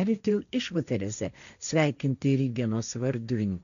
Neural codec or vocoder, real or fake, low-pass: codec, 16 kHz, 1.1 kbps, Voila-Tokenizer; fake; 7.2 kHz